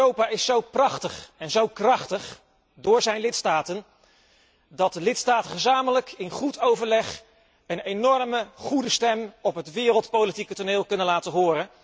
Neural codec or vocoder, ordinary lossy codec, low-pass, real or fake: none; none; none; real